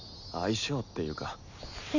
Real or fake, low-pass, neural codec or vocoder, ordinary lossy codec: real; 7.2 kHz; none; none